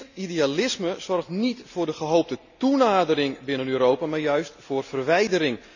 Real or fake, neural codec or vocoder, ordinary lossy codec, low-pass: real; none; none; 7.2 kHz